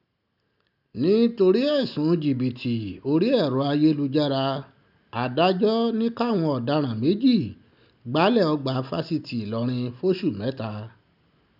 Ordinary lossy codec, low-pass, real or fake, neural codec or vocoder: none; 5.4 kHz; real; none